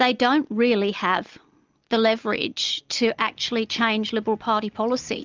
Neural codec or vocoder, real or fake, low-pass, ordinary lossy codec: none; real; 7.2 kHz; Opus, 24 kbps